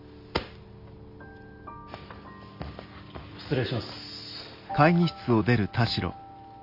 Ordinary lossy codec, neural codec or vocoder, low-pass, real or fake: Opus, 64 kbps; none; 5.4 kHz; real